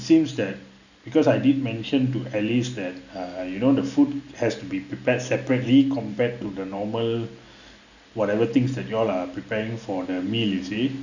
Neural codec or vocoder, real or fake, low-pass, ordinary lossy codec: none; real; 7.2 kHz; none